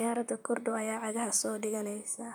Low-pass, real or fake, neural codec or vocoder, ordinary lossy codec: none; fake; vocoder, 44.1 kHz, 128 mel bands, Pupu-Vocoder; none